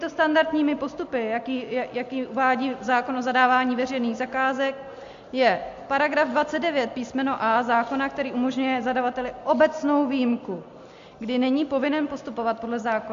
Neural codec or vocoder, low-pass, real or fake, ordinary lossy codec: none; 7.2 kHz; real; MP3, 48 kbps